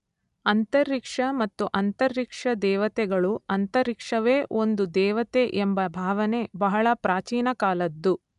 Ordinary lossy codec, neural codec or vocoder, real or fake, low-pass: none; none; real; 10.8 kHz